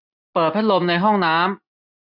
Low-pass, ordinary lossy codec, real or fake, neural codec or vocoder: 5.4 kHz; none; real; none